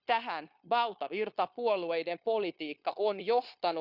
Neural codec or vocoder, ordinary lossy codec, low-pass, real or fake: codec, 16 kHz, 0.9 kbps, LongCat-Audio-Codec; Opus, 64 kbps; 5.4 kHz; fake